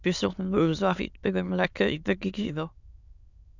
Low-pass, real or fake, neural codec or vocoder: 7.2 kHz; fake; autoencoder, 22.05 kHz, a latent of 192 numbers a frame, VITS, trained on many speakers